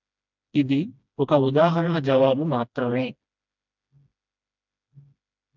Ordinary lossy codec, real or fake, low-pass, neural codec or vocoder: none; fake; 7.2 kHz; codec, 16 kHz, 1 kbps, FreqCodec, smaller model